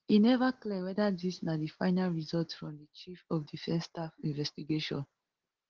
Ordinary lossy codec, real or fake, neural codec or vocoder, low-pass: Opus, 16 kbps; real; none; 7.2 kHz